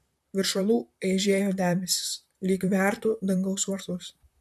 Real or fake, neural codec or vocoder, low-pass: fake; vocoder, 44.1 kHz, 128 mel bands, Pupu-Vocoder; 14.4 kHz